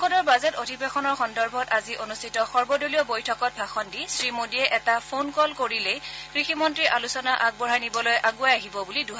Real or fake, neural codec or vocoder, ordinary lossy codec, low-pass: real; none; none; none